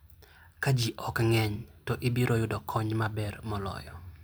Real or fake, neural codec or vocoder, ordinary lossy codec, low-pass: real; none; none; none